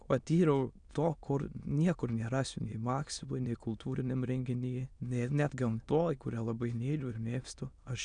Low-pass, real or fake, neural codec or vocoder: 9.9 kHz; fake; autoencoder, 22.05 kHz, a latent of 192 numbers a frame, VITS, trained on many speakers